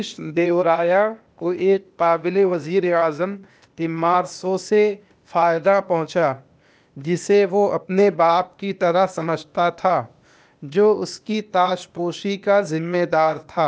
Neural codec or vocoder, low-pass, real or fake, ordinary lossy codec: codec, 16 kHz, 0.8 kbps, ZipCodec; none; fake; none